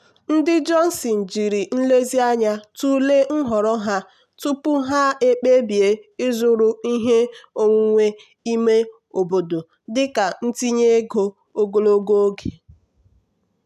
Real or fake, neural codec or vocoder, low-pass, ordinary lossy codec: real; none; 14.4 kHz; none